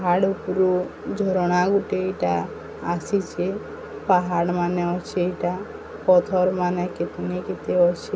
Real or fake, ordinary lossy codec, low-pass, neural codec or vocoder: real; none; none; none